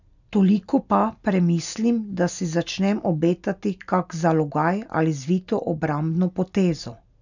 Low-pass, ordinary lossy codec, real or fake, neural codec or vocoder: 7.2 kHz; none; real; none